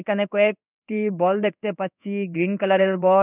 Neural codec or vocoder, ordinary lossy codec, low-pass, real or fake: codec, 16 kHz in and 24 kHz out, 1 kbps, XY-Tokenizer; none; 3.6 kHz; fake